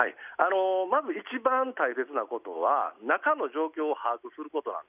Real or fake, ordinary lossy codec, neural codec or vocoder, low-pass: real; none; none; 3.6 kHz